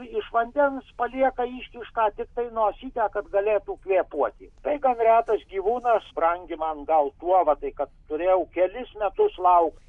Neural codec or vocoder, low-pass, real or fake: none; 10.8 kHz; real